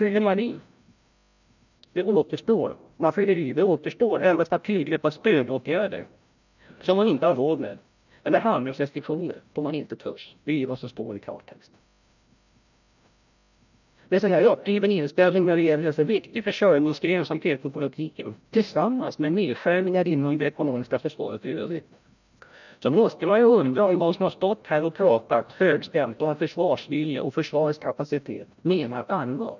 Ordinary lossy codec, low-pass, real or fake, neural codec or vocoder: none; 7.2 kHz; fake; codec, 16 kHz, 0.5 kbps, FreqCodec, larger model